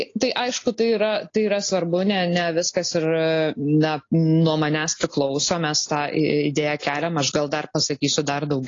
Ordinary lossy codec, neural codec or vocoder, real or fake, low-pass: AAC, 32 kbps; none; real; 7.2 kHz